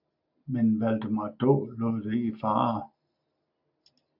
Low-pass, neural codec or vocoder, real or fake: 5.4 kHz; none; real